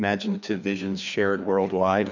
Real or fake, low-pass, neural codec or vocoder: fake; 7.2 kHz; codec, 16 kHz, 2 kbps, FreqCodec, larger model